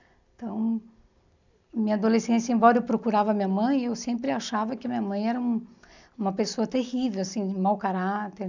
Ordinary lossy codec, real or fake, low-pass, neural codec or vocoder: none; real; 7.2 kHz; none